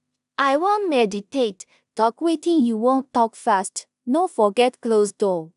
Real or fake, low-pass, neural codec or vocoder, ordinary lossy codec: fake; 10.8 kHz; codec, 16 kHz in and 24 kHz out, 0.4 kbps, LongCat-Audio-Codec, two codebook decoder; none